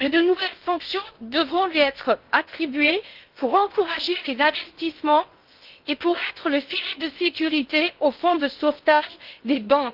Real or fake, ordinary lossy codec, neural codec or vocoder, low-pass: fake; Opus, 32 kbps; codec, 16 kHz in and 24 kHz out, 0.8 kbps, FocalCodec, streaming, 65536 codes; 5.4 kHz